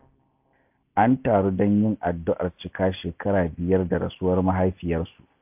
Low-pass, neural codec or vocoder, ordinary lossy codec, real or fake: 3.6 kHz; none; AAC, 32 kbps; real